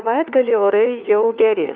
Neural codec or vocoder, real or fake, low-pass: codec, 16 kHz, 4 kbps, FunCodec, trained on LibriTTS, 50 frames a second; fake; 7.2 kHz